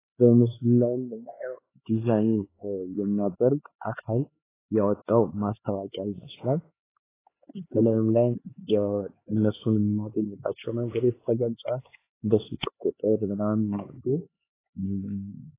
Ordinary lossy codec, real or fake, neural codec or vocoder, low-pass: AAC, 16 kbps; fake; codec, 16 kHz, 4 kbps, X-Codec, HuBERT features, trained on LibriSpeech; 3.6 kHz